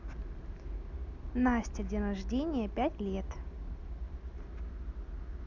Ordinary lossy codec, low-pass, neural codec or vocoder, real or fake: none; 7.2 kHz; none; real